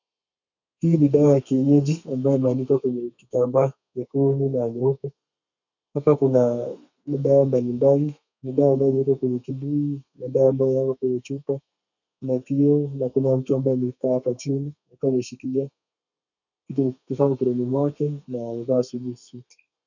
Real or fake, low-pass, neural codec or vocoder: fake; 7.2 kHz; codec, 32 kHz, 1.9 kbps, SNAC